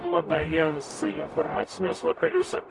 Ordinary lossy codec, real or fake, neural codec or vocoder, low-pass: MP3, 96 kbps; fake; codec, 44.1 kHz, 0.9 kbps, DAC; 10.8 kHz